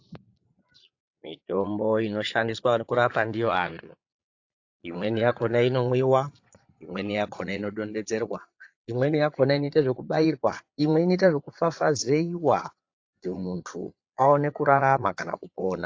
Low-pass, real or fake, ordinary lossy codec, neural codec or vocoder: 7.2 kHz; fake; AAC, 48 kbps; vocoder, 22.05 kHz, 80 mel bands, Vocos